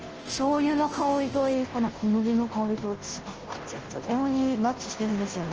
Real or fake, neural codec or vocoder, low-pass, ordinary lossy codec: fake; codec, 16 kHz, 0.5 kbps, FunCodec, trained on Chinese and English, 25 frames a second; 7.2 kHz; Opus, 16 kbps